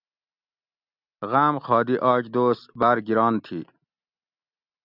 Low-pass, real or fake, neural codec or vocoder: 5.4 kHz; real; none